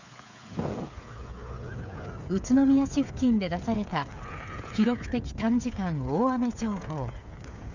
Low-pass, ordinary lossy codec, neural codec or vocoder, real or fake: 7.2 kHz; none; codec, 16 kHz, 8 kbps, FreqCodec, smaller model; fake